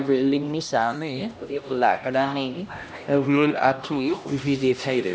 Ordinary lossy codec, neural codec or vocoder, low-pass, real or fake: none; codec, 16 kHz, 1 kbps, X-Codec, HuBERT features, trained on LibriSpeech; none; fake